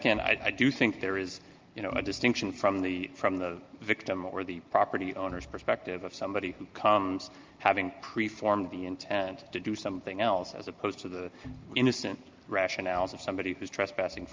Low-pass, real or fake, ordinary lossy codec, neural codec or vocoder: 7.2 kHz; real; Opus, 32 kbps; none